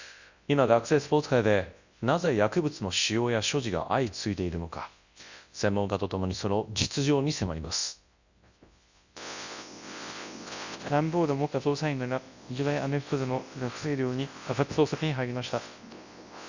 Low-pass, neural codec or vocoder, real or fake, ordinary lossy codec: 7.2 kHz; codec, 24 kHz, 0.9 kbps, WavTokenizer, large speech release; fake; Opus, 64 kbps